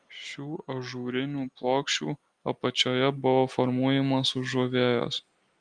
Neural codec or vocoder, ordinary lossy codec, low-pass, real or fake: none; Opus, 32 kbps; 9.9 kHz; real